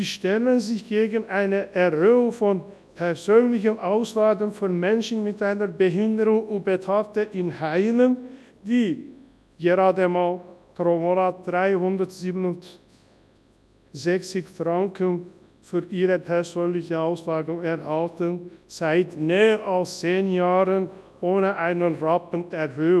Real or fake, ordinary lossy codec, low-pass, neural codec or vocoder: fake; none; none; codec, 24 kHz, 0.9 kbps, WavTokenizer, large speech release